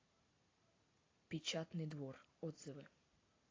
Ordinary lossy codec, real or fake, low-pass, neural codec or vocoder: AAC, 32 kbps; real; 7.2 kHz; none